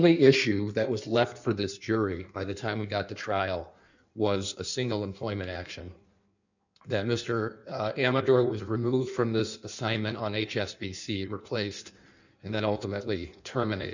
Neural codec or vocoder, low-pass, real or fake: codec, 16 kHz in and 24 kHz out, 1.1 kbps, FireRedTTS-2 codec; 7.2 kHz; fake